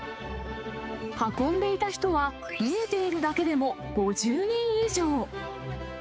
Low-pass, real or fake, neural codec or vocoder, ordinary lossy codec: none; fake; codec, 16 kHz, 4 kbps, X-Codec, HuBERT features, trained on balanced general audio; none